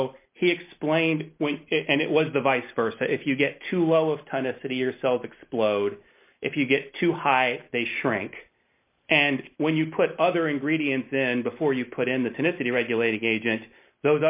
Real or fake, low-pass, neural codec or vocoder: real; 3.6 kHz; none